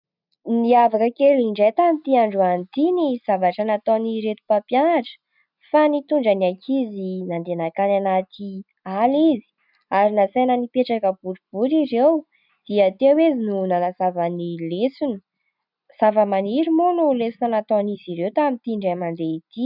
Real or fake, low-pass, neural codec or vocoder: real; 5.4 kHz; none